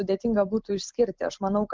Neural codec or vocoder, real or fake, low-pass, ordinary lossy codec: none; real; 7.2 kHz; Opus, 24 kbps